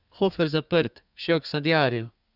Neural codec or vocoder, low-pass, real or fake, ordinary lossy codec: codec, 32 kHz, 1.9 kbps, SNAC; 5.4 kHz; fake; none